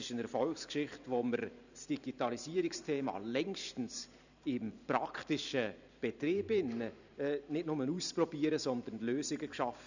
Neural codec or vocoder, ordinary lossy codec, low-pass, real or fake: vocoder, 44.1 kHz, 128 mel bands every 512 samples, BigVGAN v2; none; 7.2 kHz; fake